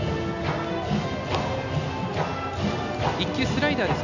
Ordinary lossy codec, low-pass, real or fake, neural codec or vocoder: none; 7.2 kHz; real; none